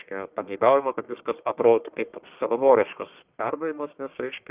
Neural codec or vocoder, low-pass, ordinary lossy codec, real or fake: codec, 44.1 kHz, 1.7 kbps, Pupu-Codec; 3.6 kHz; Opus, 24 kbps; fake